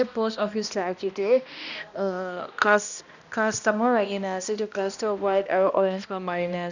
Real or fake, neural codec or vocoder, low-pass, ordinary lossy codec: fake; codec, 16 kHz, 1 kbps, X-Codec, HuBERT features, trained on balanced general audio; 7.2 kHz; none